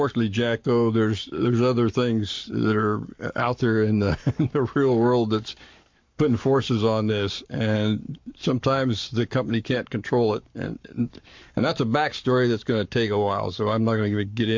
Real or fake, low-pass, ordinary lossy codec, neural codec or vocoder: fake; 7.2 kHz; MP3, 48 kbps; codec, 44.1 kHz, 7.8 kbps, Pupu-Codec